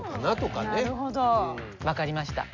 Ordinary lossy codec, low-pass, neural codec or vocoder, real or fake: MP3, 48 kbps; 7.2 kHz; none; real